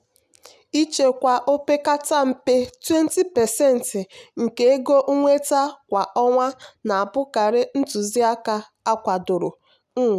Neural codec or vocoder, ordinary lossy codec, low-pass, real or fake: none; none; 14.4 kHz; real